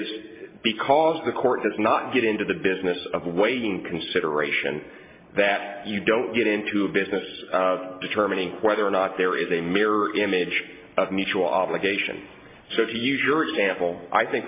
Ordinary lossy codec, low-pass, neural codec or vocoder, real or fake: MP3, 24 kbps; 3.6 kHz; none; real